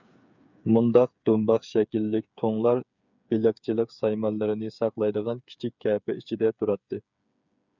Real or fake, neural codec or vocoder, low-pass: fake; codec, 16 kHz, 8 kbps, FreqCodec, smaller model; 7.2 kHz